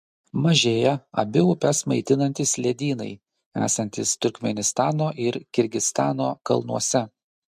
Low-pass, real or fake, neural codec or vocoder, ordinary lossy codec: 14.4 kHz; real; none; MP3, 64 kbps